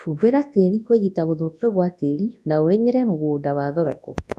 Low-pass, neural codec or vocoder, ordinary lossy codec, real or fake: none; codec, 24 kHz, 0.9 kbps, WavTokenizer, large speech release; none; fake